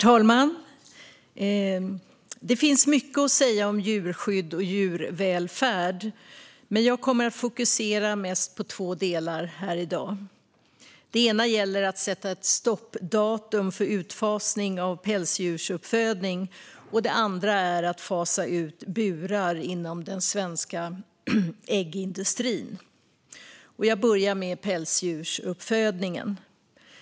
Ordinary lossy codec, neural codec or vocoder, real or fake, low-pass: none; none; real; none